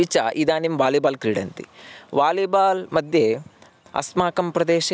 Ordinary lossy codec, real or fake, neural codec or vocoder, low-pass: none; real; none; none